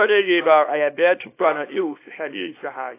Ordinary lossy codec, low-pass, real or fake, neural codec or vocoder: AAC, 24 kbps; 3.6 kHz; fake; codec, 24 kHz, 0.9 kbps, WavTokenizer, small release